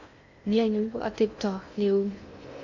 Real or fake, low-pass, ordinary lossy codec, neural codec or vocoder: fake; 7.2 kHz; none; codec, 16 kHz in and 24 kHz out, 0.6 kbps, FocalCodec, streaming, 2048 codes